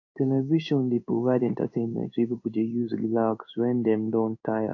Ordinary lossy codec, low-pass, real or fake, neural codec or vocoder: AAC, 48 kbps; 7.2 kHz; fake; codec, 16 kHz in and 24 kHz out, 1 kbps, XY-Tokenizer